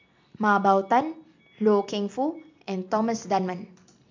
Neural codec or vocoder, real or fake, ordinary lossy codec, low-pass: none; real; MP3, 64 kbps; 7.2 kHz